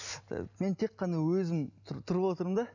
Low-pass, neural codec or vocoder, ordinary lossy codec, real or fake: 7.2 kHz; none; none; real